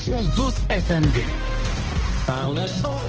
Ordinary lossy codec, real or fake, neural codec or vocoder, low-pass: Opus, 16 kbps; fake; codec, 16 kHz, 1 kbps, X-Codec, HuBERT features, trained on balanced general audio; 7.2 kHz